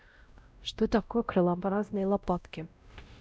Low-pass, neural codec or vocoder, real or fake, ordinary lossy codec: none; codec, 16 kHz, 0.5 kbps, X-Codec, WavLM features, trained on Multilingual LibriSpeech; fake; none